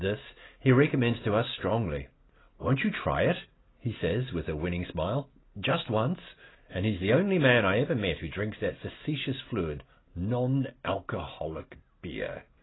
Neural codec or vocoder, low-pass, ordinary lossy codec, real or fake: none; 7.2 kHz; AAC, 16 kbps; real